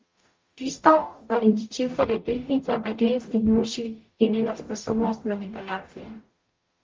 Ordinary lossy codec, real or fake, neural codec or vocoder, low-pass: Opus, 32 kbps; fake; codec, 44.1 kHz, 0.9 kbps, DAC; 7.2 kHz